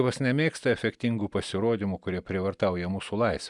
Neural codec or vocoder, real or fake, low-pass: none; real; 10.8 kHz